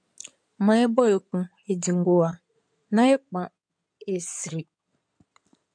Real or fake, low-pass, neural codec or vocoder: fake; 9.9 kHz; codec, 16 kHz in and 24 kHz out, 2.2 kbps, FireRedTTS-2 codec